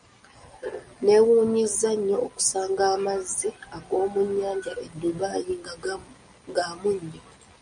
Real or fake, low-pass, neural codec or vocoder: real; 9.9 kHz; none